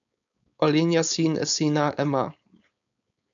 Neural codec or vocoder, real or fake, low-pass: codec, 16 kHz, 4.8 kbps, FACodec; fake; 7.2 kHz